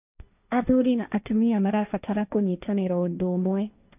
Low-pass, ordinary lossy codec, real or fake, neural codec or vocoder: 3.6 kHz; none; fake; codec, 16 kHz, 1.1 kbps, Voila-Tokenizer